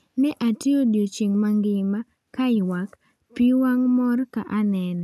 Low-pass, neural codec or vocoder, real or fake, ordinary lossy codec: 14.4 kHz; none; real; none